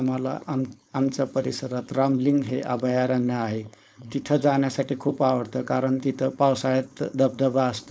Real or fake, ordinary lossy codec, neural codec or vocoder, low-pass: fake; none; codec, 16 kHz, 4.8 kbps, FACodec; none